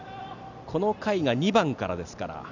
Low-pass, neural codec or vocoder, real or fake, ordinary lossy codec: 7.2 kHz; none; real; none